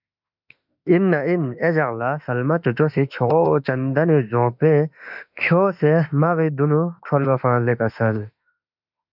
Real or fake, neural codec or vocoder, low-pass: fake; autoencoder, 48 kHz, 32 numbers a frame, DAC-VAE, trained on Japanese speech; 5.4 kHz